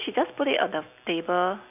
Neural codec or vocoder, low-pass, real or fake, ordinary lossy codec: none; 3.6 kHz; real; AAC, 32 kbps